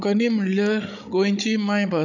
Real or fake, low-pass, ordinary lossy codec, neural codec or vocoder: fake; 7.2 kHz; none; codec, 16 kHz, 16 kbps, FreqCodec, larger model